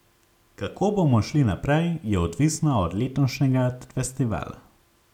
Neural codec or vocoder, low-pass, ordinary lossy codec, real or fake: none; 19.8 kHz; none; real